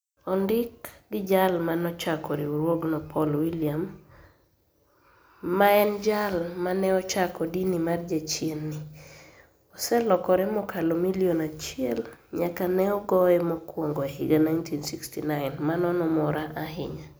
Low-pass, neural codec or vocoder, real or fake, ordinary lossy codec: none; none; real; none